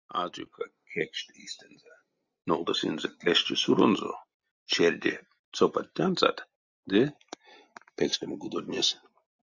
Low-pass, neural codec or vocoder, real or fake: 7.2 kHz; none; real